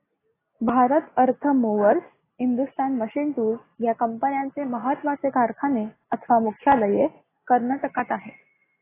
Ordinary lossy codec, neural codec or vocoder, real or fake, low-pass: AAC, 16 kbps; none; real; 3.6 kHz